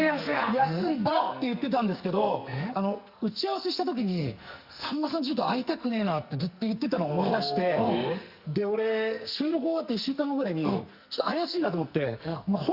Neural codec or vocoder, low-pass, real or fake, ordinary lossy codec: codec, 44.1 kHz, 2.6 kbps, SNAC; 5.4 kHz; fake; Opus, 64 kbps